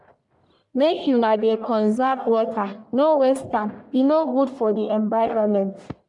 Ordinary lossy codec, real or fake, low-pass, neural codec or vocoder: none; fake; 10.8 kHz; codec, 44.1 kHz, 1.7 kbps, Pupu-Codec